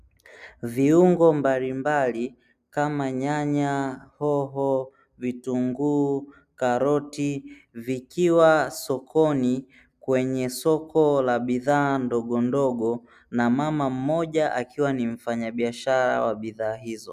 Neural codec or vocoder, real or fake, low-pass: none; real; 14.4 kHz